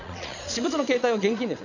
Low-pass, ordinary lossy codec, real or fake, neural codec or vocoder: 7.2 kHz; none; fake; vocoder, 22.05 kHz, 80 mel bands, Vocos